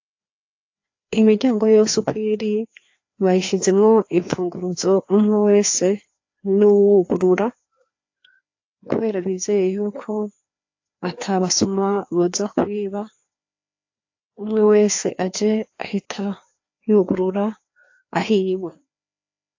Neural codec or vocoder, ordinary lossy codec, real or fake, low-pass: codec, 16 kHz, 2 kbps, FreqCodec, larger model; AAC, 48 kbps; fake; 7.2 kHz